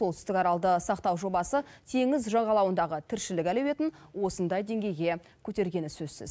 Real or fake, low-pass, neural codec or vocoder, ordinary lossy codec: real; none; none; none